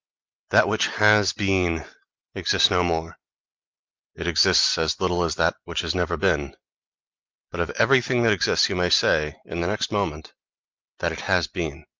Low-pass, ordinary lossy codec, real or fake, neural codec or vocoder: 7.2 kHz; Opus, 24 kbps; real; none